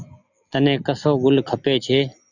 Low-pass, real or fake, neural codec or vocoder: 7.2 kHz; real; none